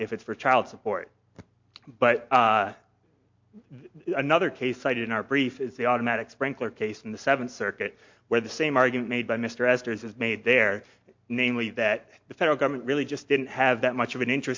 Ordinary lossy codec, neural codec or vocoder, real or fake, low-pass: MP3, 48 kbps; none; real; 7.2 kHz